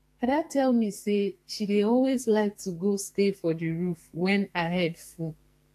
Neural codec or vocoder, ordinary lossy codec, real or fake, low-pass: codec, 44.1 kHz, 2.6 kbps, SNAC; AAC, 64 kbps; fake; 14.4 kHz